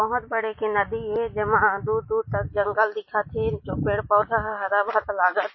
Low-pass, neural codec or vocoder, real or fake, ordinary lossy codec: 7.2 kHz; none; real; MP3, 24 kbps